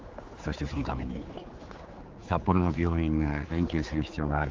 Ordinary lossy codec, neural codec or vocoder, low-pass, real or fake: Opus, 32 kbps; codec, 16 kHz, 4 kbps, X-Codec, HuBERT features, trained on general audio; 7.2 kHz; fake